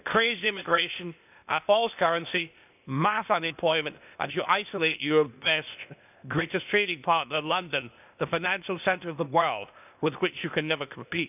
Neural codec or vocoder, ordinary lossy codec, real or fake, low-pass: codec, 16 kHz, 0.8 kbps, ZipCodec; none; fake; 3.6 kHz